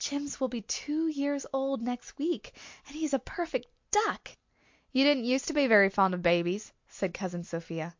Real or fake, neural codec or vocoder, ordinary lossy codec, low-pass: real; none; MP3, 48 kbps; 7.2 kHz